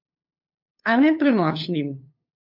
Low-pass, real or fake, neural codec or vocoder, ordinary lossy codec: 5.4 kHz; fake; codec, 16 kHz, 2 kbps, FunCodec, trained on LibriTTS, 25 frames a second; MP3, 48 kbps